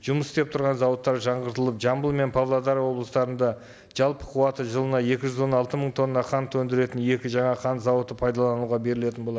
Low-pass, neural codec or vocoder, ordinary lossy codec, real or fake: none; none; none; real